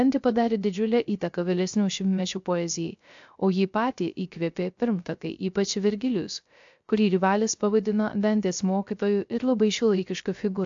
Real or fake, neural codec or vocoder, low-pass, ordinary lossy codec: fake; codec, 16 kHz, 0.3 kbps, FocalCodec; 7.2 kHz; AAC, 64 kbps